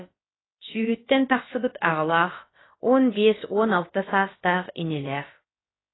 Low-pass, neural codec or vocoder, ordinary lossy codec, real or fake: 7.2 kHz; codec, 16 kHz, about 1 kbps, DyCAST, with the encoder's durations; AAC, 16 kbps; fake